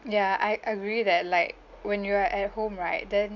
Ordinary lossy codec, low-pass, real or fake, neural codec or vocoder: none; 7.2 kHz; real; none